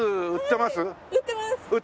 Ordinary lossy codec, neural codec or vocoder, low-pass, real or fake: none; none; none; real